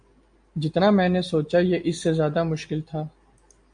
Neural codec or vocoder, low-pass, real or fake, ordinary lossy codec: none; 9.9 kHz; real; AAC, 64 kbps